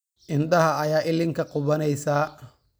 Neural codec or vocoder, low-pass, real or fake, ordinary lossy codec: none; none; real; none